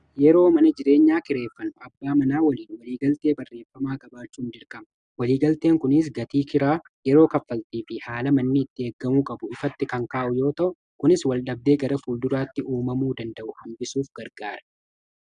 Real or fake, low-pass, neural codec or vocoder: real; 9.9 kHz; none